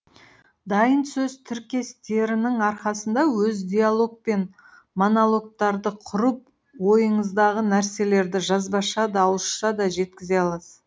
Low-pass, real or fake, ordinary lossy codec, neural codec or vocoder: none; real; none; none